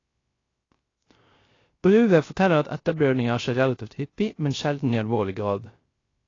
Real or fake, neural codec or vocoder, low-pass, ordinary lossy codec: fake; codec, 16 kHz, 0.3 kbps, FocalCodec; 7.2 kHz; AAC, 32 kbps